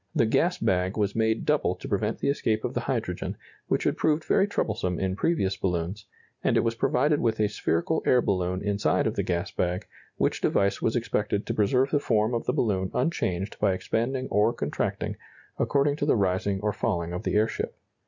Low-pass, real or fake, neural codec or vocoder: 7.2 kHz; real; none